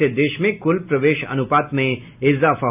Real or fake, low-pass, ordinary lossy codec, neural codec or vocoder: real; 3.6 kHz; none; none